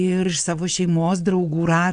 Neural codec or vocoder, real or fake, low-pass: vocoder, 22.05 kHz, 80 mel bands, WaveNeXt; fake; 9.9 kHz